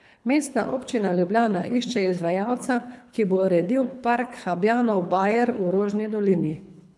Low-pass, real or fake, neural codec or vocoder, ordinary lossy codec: none; fake; codec, 24 kHz, 3 kbps, HILCodec; none